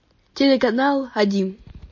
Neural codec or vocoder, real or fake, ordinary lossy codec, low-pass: none; real; MP3, 32 kbps; 7.2 kHz